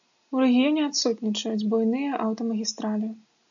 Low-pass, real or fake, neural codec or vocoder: 7.2 kHz; real; none